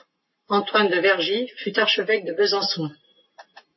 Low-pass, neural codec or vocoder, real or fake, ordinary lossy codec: 7.2 kHz; none; real; MP3, 24 kbps